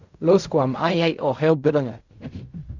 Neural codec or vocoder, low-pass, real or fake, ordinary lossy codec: codec, 16 kHz in and 24 kHz out, 0.4 kbps, LongCat-Audio-Codec, fine tuned four codebook decoder; 7.2 kHz; fake; Opus, 64 kbps